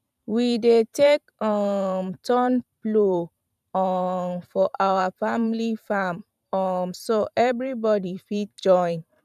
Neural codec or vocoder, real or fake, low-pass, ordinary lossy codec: vocoder, 44.1 kHz, 128 mel bands every 512 samples, BigVGAN v2; fake; 14.4 kHz; none